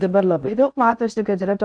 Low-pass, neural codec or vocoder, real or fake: 9.9 kHz; codec, 16 kHz in and 24 kHz out, 0.6 kbps, FocalCodec, streaming, 4096 codes; fake